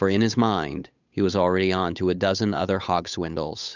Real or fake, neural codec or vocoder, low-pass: fake; codec, 16 kHz, 8 kbps, FunCodec, trained on Chinese and English, 25 frames a second; 7.2 kHz